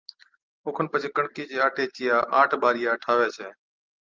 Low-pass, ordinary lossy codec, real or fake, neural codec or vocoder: 7.2 kHz; Opus, 24 kbps; real; none